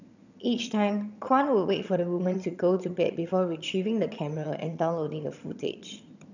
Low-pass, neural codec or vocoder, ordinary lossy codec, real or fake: 7.2 kHz; vocoder, 22.05 kHz, 80 mel bands, HiFi-GAN; none; fake